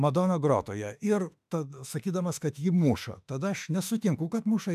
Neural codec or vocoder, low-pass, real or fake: autoencoder, 48 kHz, 32 numbers a frame, DAC-VAE, trained on Japanese speech; 14.4 kHz; fake